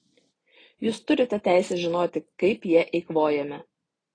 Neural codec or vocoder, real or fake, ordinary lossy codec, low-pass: none; real; AAC, 32 kbps; 9.9 kHz